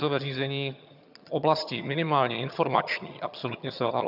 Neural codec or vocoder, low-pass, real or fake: vocoder, 22.05 kHz, 80 mel bands, HiFi-GAN; 5.4 kHz; fake